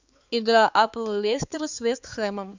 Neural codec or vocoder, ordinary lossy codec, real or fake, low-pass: codec, 16 kHz, 4 kbps, X-Codec, HuBERT features, trained on balanced general audio; Opus, 64 kbps; fake; 7.2 kHz